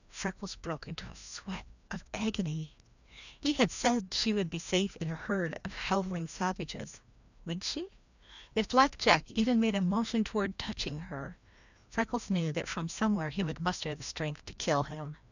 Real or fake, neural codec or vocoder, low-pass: fake; codec, 16 kHz, 1 kbps, FreqCodec, larger model; 7.2 kHz